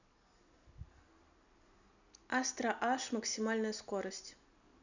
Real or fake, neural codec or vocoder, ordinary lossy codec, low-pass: real; none; none; 7.2 kHz